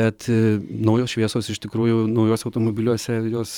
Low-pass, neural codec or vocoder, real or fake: 19.8 kHz; vocoder, 44.1 kHz, 128 mel bands every 512 samples, BigVGAN v2; fake